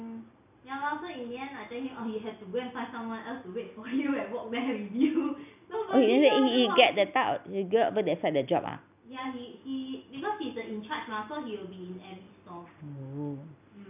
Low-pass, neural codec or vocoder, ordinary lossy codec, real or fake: 3.6 kHz; none; none; real